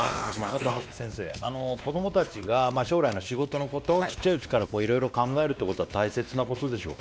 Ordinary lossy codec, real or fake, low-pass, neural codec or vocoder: none; fake; none; codec, 16 kHz, 2 kbps, X-Codec, WavLM features, trained on Multilingual LibriSpeech